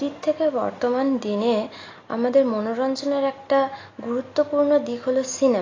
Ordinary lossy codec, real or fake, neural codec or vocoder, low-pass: AAC, 32 kbps; real; none; 7.2 kHz